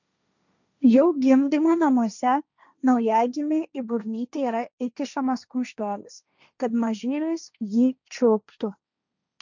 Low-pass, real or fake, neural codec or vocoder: 7.2 kHz; fake; codec, 16 kHz, 1.1 kbps, Voila-Tokenizer